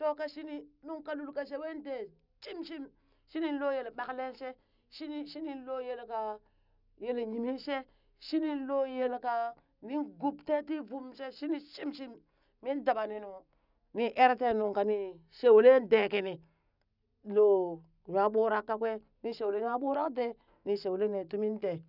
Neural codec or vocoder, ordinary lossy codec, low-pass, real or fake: none; none; 5.4 kHz; real